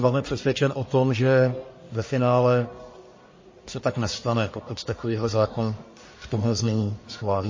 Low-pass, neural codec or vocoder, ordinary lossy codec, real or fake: 7.2 kHz; codec, 44.1 kHz, 1.7 kbps, Pupu-Codec; MP3, 32 kbps; fake